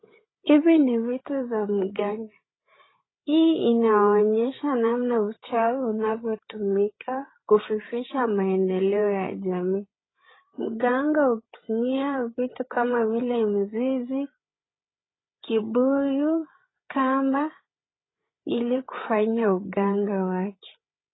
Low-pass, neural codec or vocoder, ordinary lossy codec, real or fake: 7.2 kHz; codec, 16 kHz, 8 kbps, FreqCodec, larger model; AAC, 16 kbps; fake